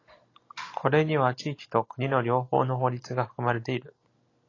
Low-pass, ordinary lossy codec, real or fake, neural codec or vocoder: 7.2 kHz; AAC, 32 kbps; real; none